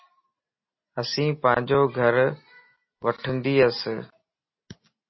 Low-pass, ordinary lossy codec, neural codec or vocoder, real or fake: 7.2 kHz; MP3, 24 kbps; none; real